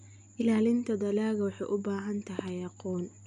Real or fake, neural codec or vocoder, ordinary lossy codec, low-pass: real; none; none; 9.9 kHz